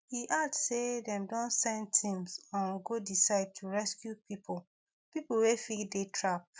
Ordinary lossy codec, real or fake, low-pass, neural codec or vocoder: none; real; none; none